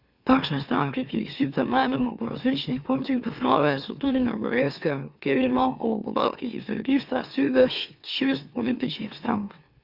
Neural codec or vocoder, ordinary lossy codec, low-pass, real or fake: autoencoder, 44.1 kHz, a latent of 192 numbers a frame, MeloTTS; Opus, 64 kbps; 5.4 kHz; fake